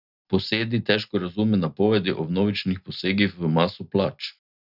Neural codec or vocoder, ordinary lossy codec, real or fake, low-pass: none; none; real; 5.4 kHz